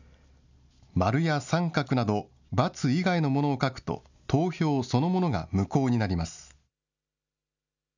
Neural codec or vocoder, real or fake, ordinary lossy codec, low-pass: none; real; none; 7.2 kHz